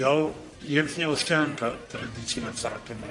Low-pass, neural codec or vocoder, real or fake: 10.8 kHz; codec, 44.1 kHz, 1.7 kbps, Pupu-Codec; fake